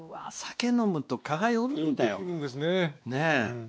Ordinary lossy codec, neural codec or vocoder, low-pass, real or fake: none; codec, 16 kHz, 2 kbps, X-Codec, WavLM features, trained on Multilingual LibriSpeech; none; fake